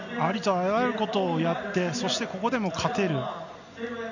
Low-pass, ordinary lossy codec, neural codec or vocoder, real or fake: 7.2 kHz; none; none; real